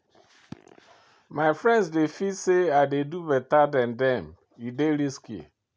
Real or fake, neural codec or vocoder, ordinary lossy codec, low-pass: real; none; none; none